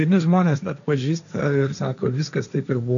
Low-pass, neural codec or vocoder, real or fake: 7.2 kHz; codec, 16 kHz, 1.1 kbps, Voila-Tokenizer; fake